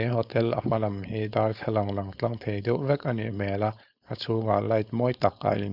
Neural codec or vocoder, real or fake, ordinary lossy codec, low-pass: codec, 16 kHz, 4.8 kbps, FACodec; fake; Opus, 64 kbps; 5.4 kHz